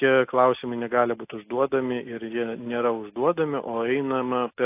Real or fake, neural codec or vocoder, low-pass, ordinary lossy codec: real; none; 3.6 kHz; AAC, 24 kbps